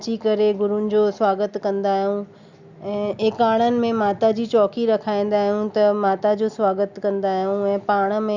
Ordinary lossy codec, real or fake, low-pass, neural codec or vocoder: Opus, 64 kbps; real; 7.2 kHz; none